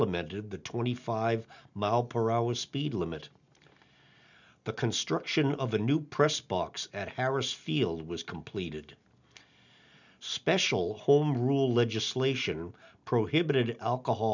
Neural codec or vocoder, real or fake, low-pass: none; real; 7.2 kHz